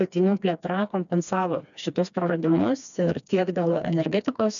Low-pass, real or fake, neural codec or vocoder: 7.2 kHz; fake; codec, 16 kHz, 2 kbps, FreqCodec, smaller model